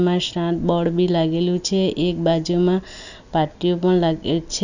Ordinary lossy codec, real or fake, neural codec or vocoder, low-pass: none; real; none; 7.2 kHz